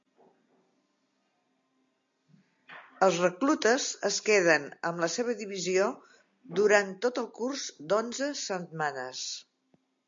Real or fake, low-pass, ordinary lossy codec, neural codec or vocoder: real; 7.2 kHz; MP3, 48 kbps; none